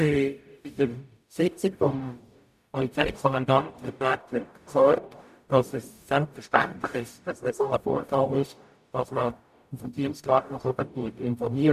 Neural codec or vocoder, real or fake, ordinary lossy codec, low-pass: codec, 44.1 kHz, 0.9 kbps, DAC; fake; none; 14.4 kHz